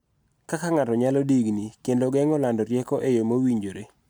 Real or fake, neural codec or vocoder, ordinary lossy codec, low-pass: real; none; none; none